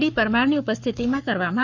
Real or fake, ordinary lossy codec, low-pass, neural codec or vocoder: fake; none; 7.2 kHz; codec, 44.1 kHz, 7.8 kbps, Pupu-Codec